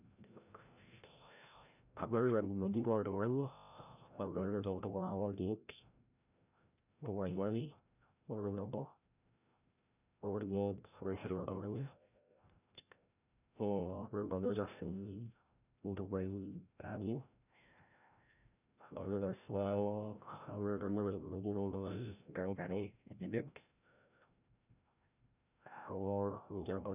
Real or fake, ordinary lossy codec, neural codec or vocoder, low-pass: fake; none; codec, 16 kHz, 0.5 kbps, FreqCodec, larger model; 3.6 kHz